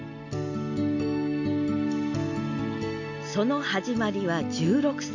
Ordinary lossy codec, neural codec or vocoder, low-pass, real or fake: none; none; 7.2 kHz; real